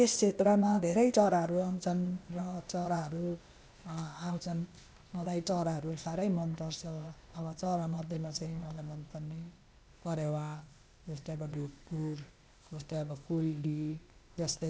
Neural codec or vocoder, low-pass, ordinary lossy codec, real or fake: codec, 16 kHz, 0.8 kbps, ZipCodec; none; none; fake